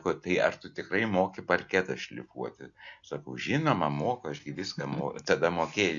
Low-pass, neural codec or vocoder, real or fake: 7.2 kHz; none; real